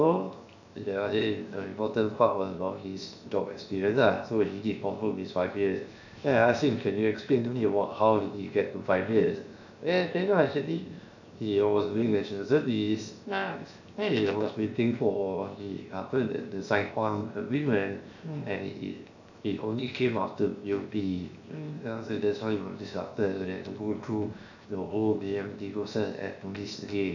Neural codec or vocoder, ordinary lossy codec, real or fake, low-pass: codec, 16 kHz, 0.7 kbps, FocalCodec; none; fake; 7.2 kHz